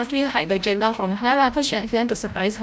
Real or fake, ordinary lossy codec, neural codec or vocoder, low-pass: fake; none; codec, 16 kHz, 0.5 kbps, FreqCodec, larger model; none